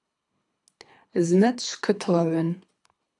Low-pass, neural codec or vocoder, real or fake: 10.8 kHz; codec, 24 kHz, 3 kbps, HILCodec; fake